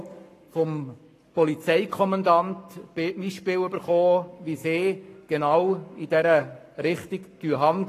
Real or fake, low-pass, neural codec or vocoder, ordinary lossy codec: fake; 14.4 kHz; codec, 44.1 kHz, 7.8 kbps, Pupu-Codec; AAC, 48 kbps